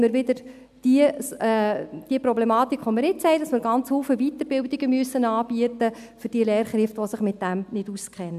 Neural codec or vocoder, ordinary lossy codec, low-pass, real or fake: none; none; 14.4 kHz; real